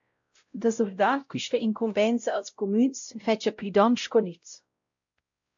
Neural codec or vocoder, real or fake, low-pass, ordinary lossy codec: codec, 16 kHz, 0.5 kbps, X-Codec, WavLM features, trained on Multilingual LibriSpeech; fake; 7.2 kHz; AAC, 64 kbps